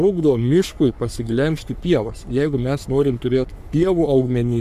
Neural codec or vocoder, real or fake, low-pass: codec, 44.1 kHz, 3.4 kbps, Pupu-Codec; fake; 14.4 kHz